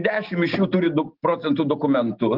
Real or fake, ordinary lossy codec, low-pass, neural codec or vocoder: real; Opus, 32 kbps; 5.4 kHz; none